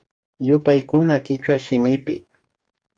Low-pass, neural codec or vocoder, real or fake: 9.9 kHz; codec, 44.1 kHz, 2.6 kbps, DAC; fake